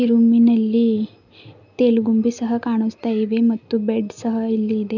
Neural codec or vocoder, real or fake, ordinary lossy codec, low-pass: none; real; none; 7.2 kHz